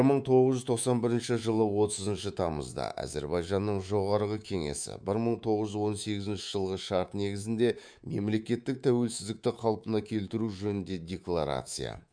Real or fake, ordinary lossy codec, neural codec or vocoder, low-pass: fake; none; codec, 24 kHz, 3.1 kbps, DualCodec; 9.9 kHz